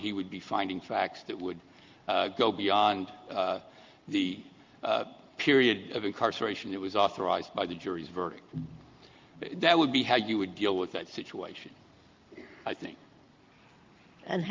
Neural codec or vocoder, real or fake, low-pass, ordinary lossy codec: none; real; 7.2 kHz; Opus, 32 kbps